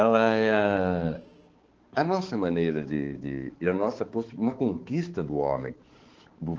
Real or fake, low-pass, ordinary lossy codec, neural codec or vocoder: fake; 7.2 kHz; Opus, 16 kbps; codec, 16 kHz, 4 kbps, X-Codec, HuBERT features, trained on balanced general audio